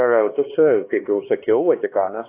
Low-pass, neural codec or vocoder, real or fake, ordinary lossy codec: 3.6 kHz; codec, 16 kHz, 2 kbps, X-Codec, HuBERT features, trained on LibriSpeech; fake; AAC, 24 kbps